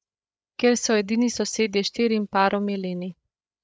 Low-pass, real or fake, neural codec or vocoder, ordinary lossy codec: none; fake; codec, 16 kHz, 8 kbps, FreqCodec, larger model; none